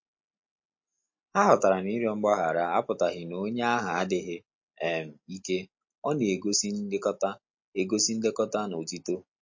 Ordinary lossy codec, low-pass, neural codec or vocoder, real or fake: MP3, 32 kbps; 7.2 kHz; none; real